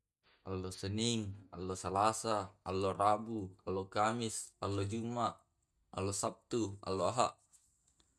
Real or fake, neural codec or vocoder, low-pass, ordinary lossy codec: real; none; none; none